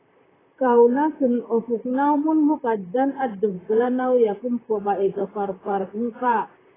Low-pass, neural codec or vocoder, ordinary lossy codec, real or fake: 3.6 kHz; vocoder, 44.1 kHz, 128 mel bands, Pupu-Vocoder; AAC, 16 kbps; fake